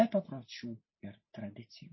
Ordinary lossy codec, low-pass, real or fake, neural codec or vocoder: MP3, 24 kbps; 7.2 kHz; real; none